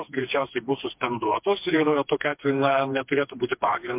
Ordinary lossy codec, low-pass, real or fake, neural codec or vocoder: MP3, 32 kbps; 3.6 kHz; fake; codec, 16 kHz, 2 kbps, FreqCodec, smaller model